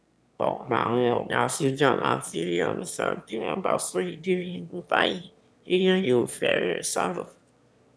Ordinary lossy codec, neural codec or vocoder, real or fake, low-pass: none; autoencoder, 22.05 kHz, a latent of 192 numbers a frame, VITS, trained on one speaker; fake; none